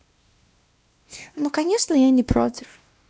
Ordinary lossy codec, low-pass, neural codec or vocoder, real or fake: none; none; codec, 16 kHz, 1 kbps, X-Codec, WavLM features, trained on Multilingual LibriSpeech; fake